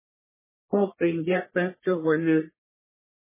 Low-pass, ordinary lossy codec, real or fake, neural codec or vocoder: 3.6 kHz; MP3, 16 kbps; fake; codec, 44.1 kHz, 1.7 kbps, Pupu-Codec